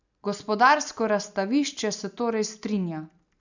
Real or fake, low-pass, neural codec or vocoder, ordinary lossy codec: real; 7.2 kHz; none; none